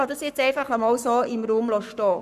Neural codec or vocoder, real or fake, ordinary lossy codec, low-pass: codec, 44.1 kHz, 7.8 kbps, Pupu-Codec; fake; none; 14.4 kHz